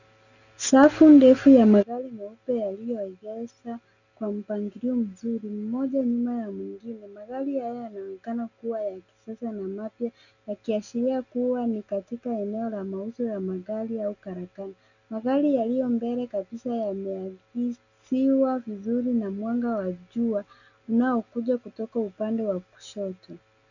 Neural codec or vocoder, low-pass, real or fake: none; 7.2 kHz; real